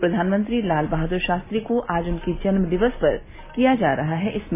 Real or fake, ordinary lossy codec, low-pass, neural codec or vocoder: real; MP3, 16 kbps; 3.6 kHz; none